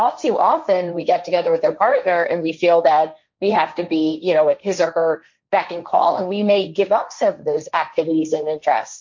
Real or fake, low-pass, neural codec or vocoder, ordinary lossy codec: fake; 7.2 kHz; codec, 16 kHz, 1.1 kbps, Voila-Tokenizer; MP3, 48 kbps